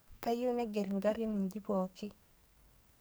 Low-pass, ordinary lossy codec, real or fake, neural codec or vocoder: none; none; fake; codec, 44.1 kHz, 2.6 kbps, SNAC